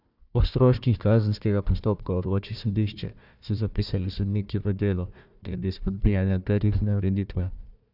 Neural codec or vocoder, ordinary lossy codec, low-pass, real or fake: codec, 16 kHz, 1 kbps, FunCodec, trained on Chinese and English, 50 frames a second; none; 5.4 kHz; fake